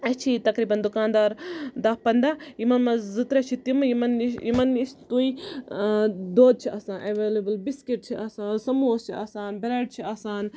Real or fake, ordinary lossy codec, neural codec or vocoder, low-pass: real; none; none; none